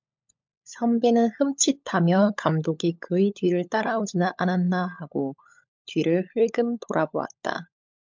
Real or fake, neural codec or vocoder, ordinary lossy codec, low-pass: fake; codec, 16 kHz, 16 kbps, FunCodec, trained on LibriTTS, 50 frames a second; MP3, 64 kbps; 7.2 kHz